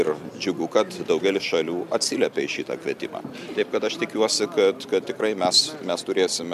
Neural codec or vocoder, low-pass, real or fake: none; 14.4 kHz; real